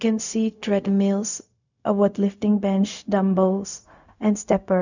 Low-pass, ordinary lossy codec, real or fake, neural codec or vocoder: 7.2 kHz; none; fake; codec, 16 kHz, 0.4 kbps, LongCat-Audio-Codec